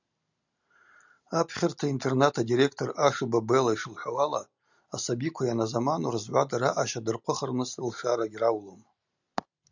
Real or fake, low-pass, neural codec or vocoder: real; 7.2 kHz; none